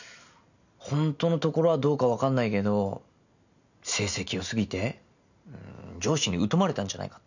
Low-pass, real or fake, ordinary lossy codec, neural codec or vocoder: 7.2 kHz; real; none; none